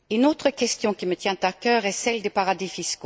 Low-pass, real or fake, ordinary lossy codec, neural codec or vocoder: none; real; none; none